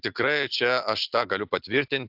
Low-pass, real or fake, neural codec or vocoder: 5.4 kHz; real; none